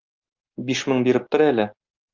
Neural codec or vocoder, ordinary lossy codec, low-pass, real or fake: none; Opus, 24 kbps; 7.2 kHz; real